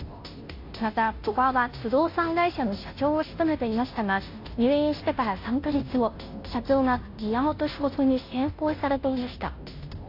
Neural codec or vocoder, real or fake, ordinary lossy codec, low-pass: codec, 16 kHz, 0.5 kbps, FunCodec, trained on Chinese and English, 25 frames a second; fake; AAC, 32 kbps; 5.4 kHz